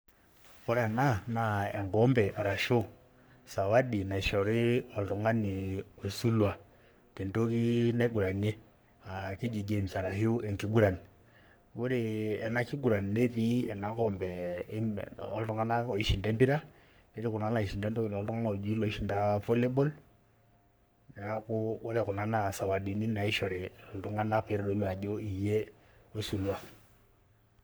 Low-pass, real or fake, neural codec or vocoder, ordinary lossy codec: none; fake; codec, 44.1 kHz, 3.4 kbps, Pupu-Codec; none